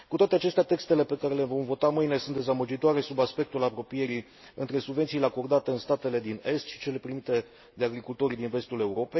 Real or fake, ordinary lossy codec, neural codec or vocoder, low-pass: real; MP3, 24 kbps; none; 7.2 kHz